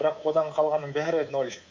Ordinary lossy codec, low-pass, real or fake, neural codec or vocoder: MP3, 64 kbps; 7.2 kHz; fake; codec, 24 kHz, 3.1 kbps, DualCodec